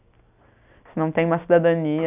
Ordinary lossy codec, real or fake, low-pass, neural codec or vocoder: none; real; 3.6 kHz; none